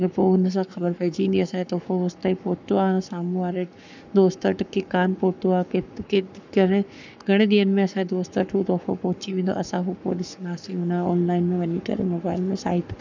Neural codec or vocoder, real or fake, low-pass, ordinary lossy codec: codec, 44.1 kHz, 7.8 kbps, Pupu-Codec; fake; 7.2 kHz; none